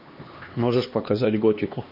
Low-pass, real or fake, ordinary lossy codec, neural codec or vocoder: 5.4 kHz; fake; MP3, 32 kbps; codec, 16 kHz, 2 kbps, X-Codec, HuBERT features, trained on LibriSpeech